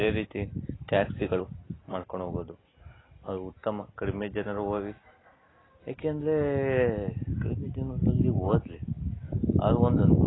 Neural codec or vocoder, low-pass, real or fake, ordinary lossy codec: none; 7.2 kHz; real; AAC, 16 kbps